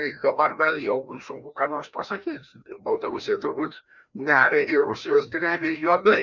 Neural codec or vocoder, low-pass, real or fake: codec, 16 kHz, 1 kbps, FreqCodec, larger model; 7.2 kHz; fake